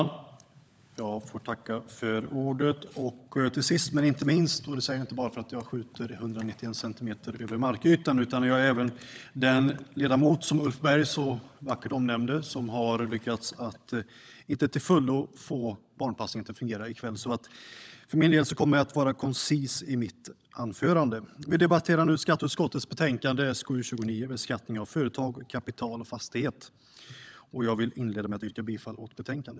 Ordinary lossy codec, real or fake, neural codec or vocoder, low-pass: none; fake; codec, 16 kHz, 16 kbps, FunCodec, trained on LibriTTS, 50 frames a second; none